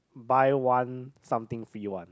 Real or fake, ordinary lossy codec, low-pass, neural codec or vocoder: real; none; none; none